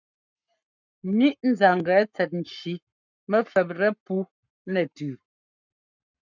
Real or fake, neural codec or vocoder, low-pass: fake; codec, 44.1 kHz, 7.8 kbps, Pupu-Codec; 7.2 kHz